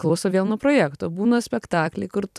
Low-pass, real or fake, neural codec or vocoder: 14.4 kHz; fake; vocoder, 44.1 kHz, 128 mel bands every 256 samples, BigVGAN v2